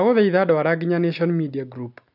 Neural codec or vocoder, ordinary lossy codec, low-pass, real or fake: none; none; 5.4 kHz; real